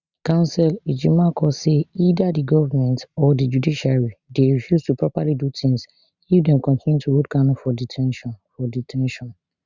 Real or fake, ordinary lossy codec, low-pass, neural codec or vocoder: real; Opus, 64 kbps; 7.2 kHz; none